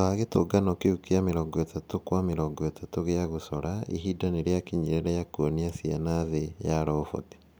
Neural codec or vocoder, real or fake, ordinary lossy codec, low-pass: none; real; none; none